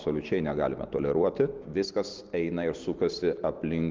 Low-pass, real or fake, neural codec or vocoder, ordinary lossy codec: 7.2 kHz; real; none; Opus, 32 kbps